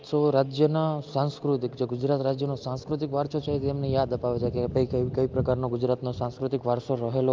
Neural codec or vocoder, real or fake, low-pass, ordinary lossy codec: none; real; 7.2 kHz; Opus, 24 kbps